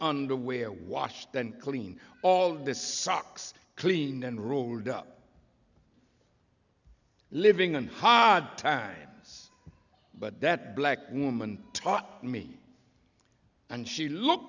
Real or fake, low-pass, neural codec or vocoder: real; 7.2 kHz; none